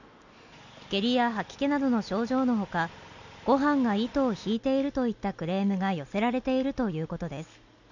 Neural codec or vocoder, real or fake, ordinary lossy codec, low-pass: none; real; none; 7.2 kHz